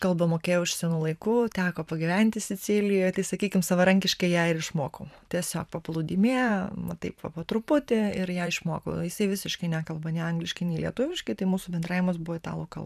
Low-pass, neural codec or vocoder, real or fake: 14.4 kHz; vocoder, 44.1 kHz, 128 mel bands every 512 samples, BigVGAN v2; fake